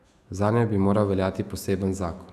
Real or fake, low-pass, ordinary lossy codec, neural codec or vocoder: fake; 14.4 kHz; none; autoencoder, 48 kHz, 128 numbers a frame, DAC-VAE, trained on Japanese speech